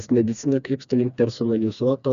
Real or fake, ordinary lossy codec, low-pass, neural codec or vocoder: fake; MP3, 96 kbps; 7.2 kHz; codec, 16 kHz, 2 kbps, FreqCodec, smaller model